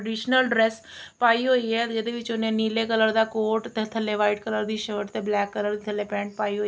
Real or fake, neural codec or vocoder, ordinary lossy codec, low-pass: real; none; none; none